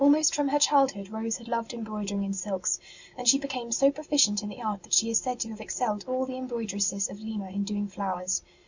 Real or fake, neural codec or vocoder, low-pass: real; none; 7.2 kHz